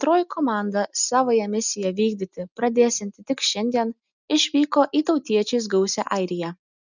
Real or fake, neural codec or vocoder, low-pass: real; none; 7.2 kHz